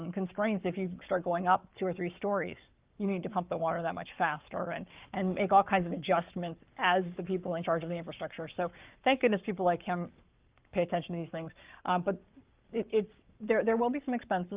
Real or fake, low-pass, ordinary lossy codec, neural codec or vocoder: fake; 3.6 kHz; Opus, 24 kbps; codec, 44.1 kHz, 7.8 kbps, Pupu-Codec